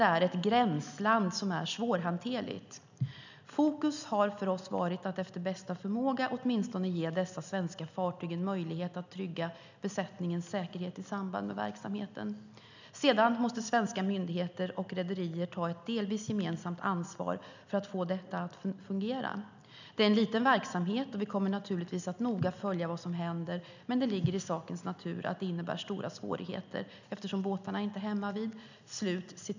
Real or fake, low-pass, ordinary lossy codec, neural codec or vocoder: real; 7.2 kHz; none; none